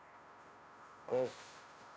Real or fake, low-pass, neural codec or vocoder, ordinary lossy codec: fake; none; codec, 16 kHz, 0.5 kbps, FunCodec, trained on Chinese and English, 25 frames a second; none